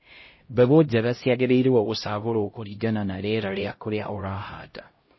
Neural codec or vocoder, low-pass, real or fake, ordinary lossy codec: codec, 16 kHz, 0.5 kbps, X-Codec, HuBERT features, trained on LibriSpeech; 7.2 kHz; fake; MP3, 24 kbps